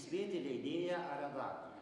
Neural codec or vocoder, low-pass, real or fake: none; 10.8 kHz; real